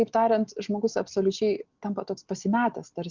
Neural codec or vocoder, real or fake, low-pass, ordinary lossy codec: none; real; 7.2 kHz; Opus, 64 kbps